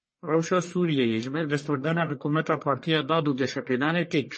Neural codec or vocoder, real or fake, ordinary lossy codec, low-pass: codec, 44.1 kHz, 1.7 kbps, Pupu-Codec; fake; MP3, 32 kbps; 9.9 kHz